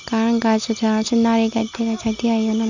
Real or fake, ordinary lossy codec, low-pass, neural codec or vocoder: real; none; 7.2 kHz; none